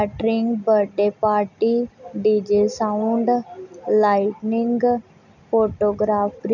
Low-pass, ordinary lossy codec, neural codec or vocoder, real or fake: 7.2 kHz; none; none; real